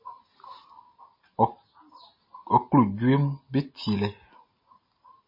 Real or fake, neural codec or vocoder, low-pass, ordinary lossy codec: real; none; 5.4 kHz; MP3, 24 kbps